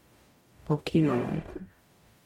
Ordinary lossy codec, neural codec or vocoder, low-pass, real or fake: MP3, 64 kbps; codec, 44.1 kHz, 0.9 kbps, DAC; 19.8 kHz; fake